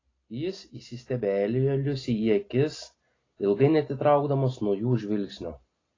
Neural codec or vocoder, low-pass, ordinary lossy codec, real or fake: none; 7.2 kHz; AAC, 32 kbps; real